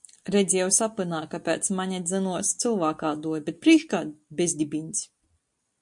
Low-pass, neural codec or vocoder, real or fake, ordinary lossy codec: 10.8 kHz; none; real; MP3, 64 kbps